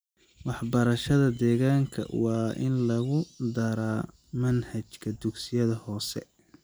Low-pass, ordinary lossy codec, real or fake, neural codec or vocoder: none; none; real; none